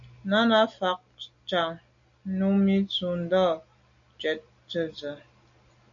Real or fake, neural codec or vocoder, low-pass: real; none; 7.2 kHz